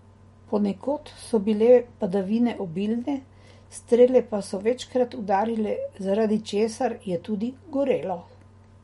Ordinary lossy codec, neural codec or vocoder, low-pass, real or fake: MP3, 48 kbps; none; 19.8 kHz; real